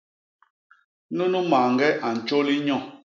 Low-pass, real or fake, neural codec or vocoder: 7.2 kHz; real; none